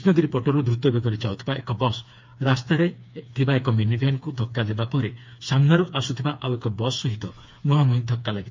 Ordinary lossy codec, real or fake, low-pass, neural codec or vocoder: MP3, 48 kbps; fake; 7.2 kHz; codec, 16 kHz, 4 kbps, FreqCodec, smaller model